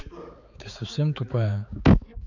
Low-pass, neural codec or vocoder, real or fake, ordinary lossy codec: 7.2 kHz; codec, 16 kHz, 4 kbps, X-Codec, HuBERT features, trained on balanced general audio; fake; none